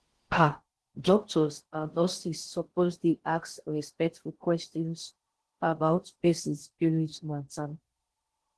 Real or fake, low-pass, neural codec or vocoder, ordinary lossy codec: fake; 10.8 kHz; codec, 16 kHz in and 24 kHz out, 0.6 kbps, FocalCodec, streaming, 4096 codes; Opus, 16 kbps